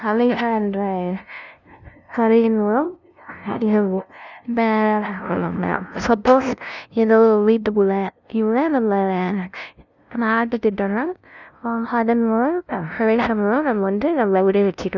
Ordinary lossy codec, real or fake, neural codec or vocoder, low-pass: none; fake; codec, 16 kHz, 0.5 kbps, FunCodec, trained on LibriTTS, 25 frames a second; 7.2 kHz